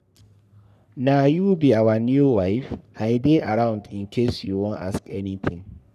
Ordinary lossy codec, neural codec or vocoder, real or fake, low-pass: none; codec, 44.1 kHz, 3.4 kbps, Pupu-Codec; fake; 14.4 kHz